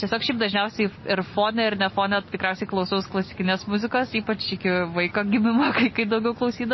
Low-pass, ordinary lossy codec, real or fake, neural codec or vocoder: 7.2 kHz; MP3, 24 kbps; real; none